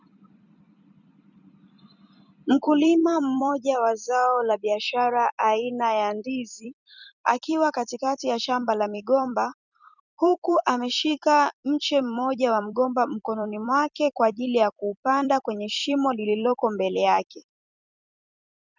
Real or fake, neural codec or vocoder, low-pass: fake; vocoder, 44.1 kHz, 128 mel bands every 256 samples, BigVGAN v2; 7.2 kHz